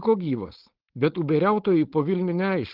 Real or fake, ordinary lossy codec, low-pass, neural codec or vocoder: fake; Opus, 16 kbps; 5.4 kHz; codec, 16 kHz, 4.8 kbps, FACodec